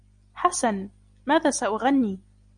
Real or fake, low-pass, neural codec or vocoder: real; 9.9 kHz; none